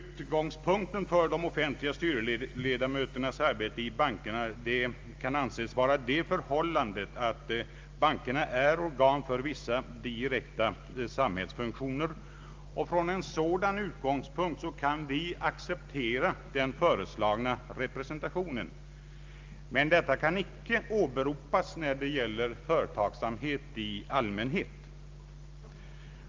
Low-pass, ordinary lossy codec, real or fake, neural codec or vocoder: 7.2 kHz; Opus, 32 kbps; real; none